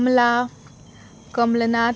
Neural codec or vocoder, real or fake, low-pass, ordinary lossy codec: none; real; none; none